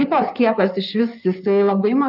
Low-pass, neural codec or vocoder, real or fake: 5.4 kHz; codec, 16 kHz in and 24 kHz out, 2.2 kbps, FireRedTTS-2 codec; fake